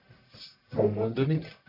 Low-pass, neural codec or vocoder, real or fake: 5.4 kHz; codec, 44.1 kHz, 1.7 kbps, Pupu-Codec; fake